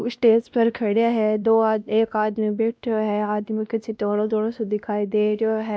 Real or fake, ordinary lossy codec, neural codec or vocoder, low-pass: fake; none; codec, 16 kHz, 1 kbps, X-Codec, WavLM features, trained on Multilingual LibriSpeech; none